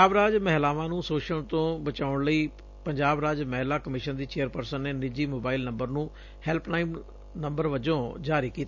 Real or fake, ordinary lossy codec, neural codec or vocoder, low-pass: real; none; none; 7.2 kHz